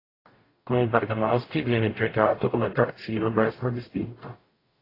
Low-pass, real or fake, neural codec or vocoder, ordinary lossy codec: 5.4 kHz; fake; codec, 44.1 kHz, 0.9 kbps, DAC; AAC, 24 kbps